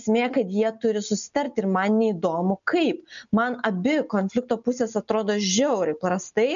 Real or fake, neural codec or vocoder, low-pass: real; none; 7.2 kHz